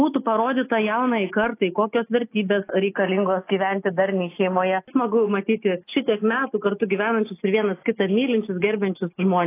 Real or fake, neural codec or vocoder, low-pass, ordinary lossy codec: real; none; 3.6 kHz; AAC, 24 kbps